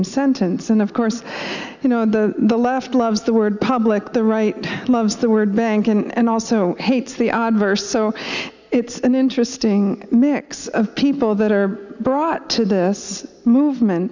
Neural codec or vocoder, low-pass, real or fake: none; 7.2 kHz; real